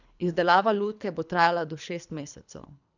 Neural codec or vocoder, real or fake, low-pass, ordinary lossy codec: codec, 24 kHz, 3 kbps, HILCodec; fake; 7.2 kHz; none